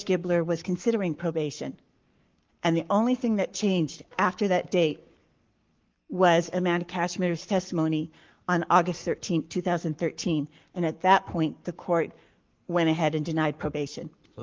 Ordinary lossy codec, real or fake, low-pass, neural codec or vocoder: Opus, 32 kbps; fake; 7.2 kHz; codec, 44.1 kHz, 7.8 kbps, Pupu-Codec